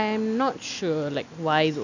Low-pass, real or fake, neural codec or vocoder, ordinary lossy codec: 7.2 kHz; real; none; none